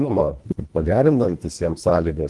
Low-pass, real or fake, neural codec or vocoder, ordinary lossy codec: 10.8 kHz; fake; codec, 24 kHz, 1.5 kbps, HILCodec; Opus, 24 kbps